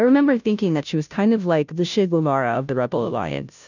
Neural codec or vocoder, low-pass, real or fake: codec, 16 kHz, 0.5 kbps, FunCodec, trained on Chinese and English, 25 frames a second; 7.2 kHz; fake